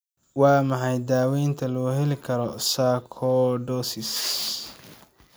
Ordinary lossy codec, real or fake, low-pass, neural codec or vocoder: none; real; none; none